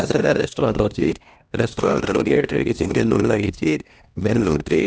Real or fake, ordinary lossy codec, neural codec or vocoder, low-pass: fake; none; codec, 16 kHz, 1 kbps, X-Codec, HuBERT features, trained on LibriSpeech; none